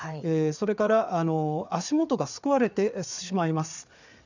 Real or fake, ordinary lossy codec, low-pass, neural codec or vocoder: fake; none; 7.2 kHz; codec, 16 kHz, 4 kbps, FreqCodec, larger model